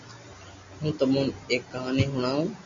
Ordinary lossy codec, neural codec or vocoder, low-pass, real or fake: MP3, 48 kbps; none; 7.2 kHz; real